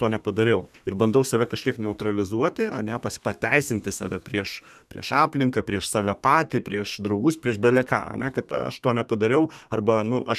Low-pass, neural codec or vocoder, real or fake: 14.4 kHz; codec, 32 kHz, 1.9 kbps, SNAC; fake